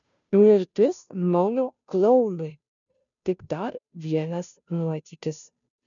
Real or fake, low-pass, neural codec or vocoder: fake; 7.2 kHz; codec, 16 kHz, 0.5 kbps, FunCodec, trained on Chinese and English, 25 frames a second